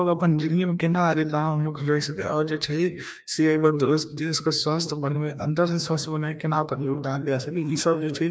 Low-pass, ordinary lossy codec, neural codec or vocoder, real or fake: none; none; codec, 16 kHz, 1 kbps, FreqCodec, larger model; fake